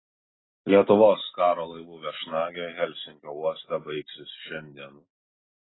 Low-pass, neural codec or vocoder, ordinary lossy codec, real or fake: 7.2 kHz; none; AAC, 16 kbps; real